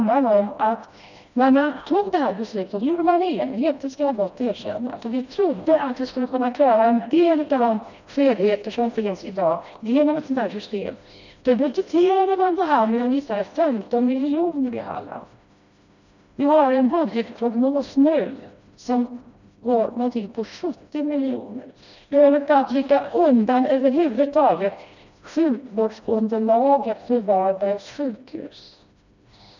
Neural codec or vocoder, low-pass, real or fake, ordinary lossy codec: codec, 16 kHz, 1 kbps, FreqCodec, smaller model; 7.2 kHz; fake; none